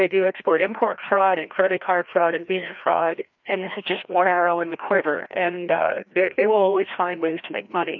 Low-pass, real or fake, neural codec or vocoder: 7.2 kHz; fake; codec, 16 kHz, 1 kbps, FreqCodec, larger model